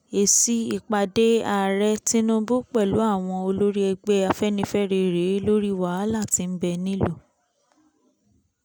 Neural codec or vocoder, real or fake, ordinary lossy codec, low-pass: none; real; none; none